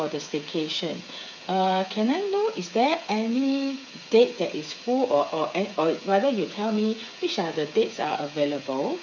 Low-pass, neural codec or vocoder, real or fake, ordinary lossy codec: 7.2 kHz; codec, 16 kHz, 8 kbps, FreqCodec, smaller model; fake; none